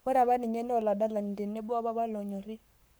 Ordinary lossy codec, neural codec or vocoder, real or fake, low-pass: none; codec, 44.1 kHz, 7.8 kbps, Pupu-Codec; fake; none